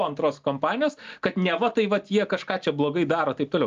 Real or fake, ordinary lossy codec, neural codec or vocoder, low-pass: real; Opus, 24 kbps; none; 7.2 kHz